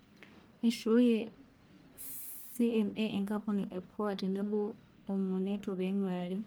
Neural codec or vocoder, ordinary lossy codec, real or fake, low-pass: codec, 44.1 kHz, 1.7 kbps, Pupu-Codec; none; fake; none